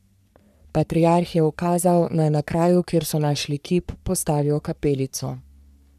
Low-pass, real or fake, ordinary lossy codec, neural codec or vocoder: 14.4 kHz; fake; none; codec, 44.1 kHz, 3.4 kbps, Pupu-Codec